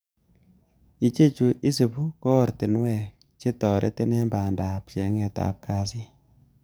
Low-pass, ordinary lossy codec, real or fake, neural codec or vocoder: none; none; fake; codec, 44.1 kHz, 7.8 kbps, DAC